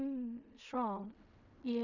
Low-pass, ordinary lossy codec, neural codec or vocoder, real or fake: 7.2 kHz; none; codec, 16 kHz in and 24 kHz out, 0.4 kbps, LongCat-Audio-Codec, fine tuned four codebook decoder; fake